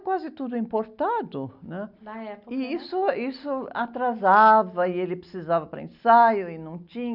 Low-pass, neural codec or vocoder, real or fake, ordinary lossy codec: 5.4 kHz; none; real; none